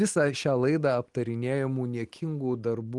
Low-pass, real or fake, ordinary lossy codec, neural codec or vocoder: 10.8 kHz; real; Opus, 24 kbps; none